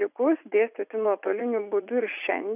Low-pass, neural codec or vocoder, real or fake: 3.6 kHz; vocoder, 44.1 kHz, 80 mel bands, Vocos; fake